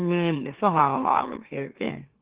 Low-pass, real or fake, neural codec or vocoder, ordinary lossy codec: 3.6 kHz; fake; autoencoder, 44.1 kHz, a latent of 192 numbers a frame, MeloTTS; Opus, 16 kbps